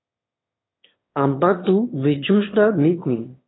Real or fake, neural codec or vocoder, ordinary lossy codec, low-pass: fake; autoencoder, 22.05 kHz, a latent of 192 numbers a frame, VITS, trained on one speaker; AAC, 16 kbps; 7.2 kHz